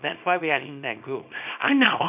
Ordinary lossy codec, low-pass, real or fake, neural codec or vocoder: none; 3.6 kHz; fake; codec, 24 kHz, 0.9 kbps, WavTokenizer, small release